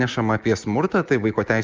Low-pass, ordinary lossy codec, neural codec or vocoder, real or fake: 7.2 kHz; Opus, 32 kbps; none; real